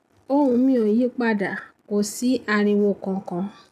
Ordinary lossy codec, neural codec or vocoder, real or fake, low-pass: none; none; real; 14.4 kHz